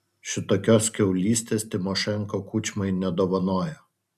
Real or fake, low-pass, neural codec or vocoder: real; 14.4 kHz; none